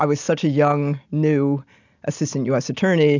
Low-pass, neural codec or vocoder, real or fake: 7.2 kHz; none; real